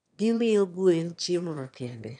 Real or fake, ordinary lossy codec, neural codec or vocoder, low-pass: fake; none; autoencoder, 22.05 kHz, a latent of 192 numbers a frame, VITS, trained on one speaker; 9.9 kHz